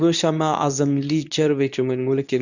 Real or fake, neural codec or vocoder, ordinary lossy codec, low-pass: fake; codec, 24 kHz, 0.9 kbps, WavTokenizer, medium speech release version 2; none; 7.2 kHz